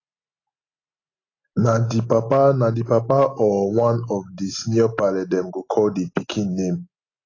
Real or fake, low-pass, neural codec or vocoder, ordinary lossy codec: real; 7.2 kHz; none; AAC, 32 kbps